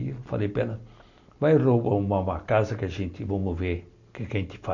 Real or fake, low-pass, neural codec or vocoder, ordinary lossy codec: real; 7.2 kHz; none; none